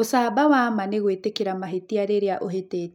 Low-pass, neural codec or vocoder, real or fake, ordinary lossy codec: 19.8 kHz; none; real; MP3, 96 kbps